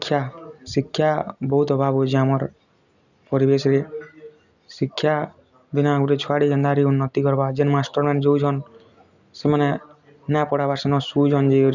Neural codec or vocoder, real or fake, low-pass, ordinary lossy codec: none; real; 7.2 kHz; none